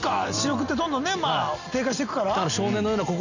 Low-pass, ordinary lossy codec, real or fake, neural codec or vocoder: 7.2 kHz; none; real; none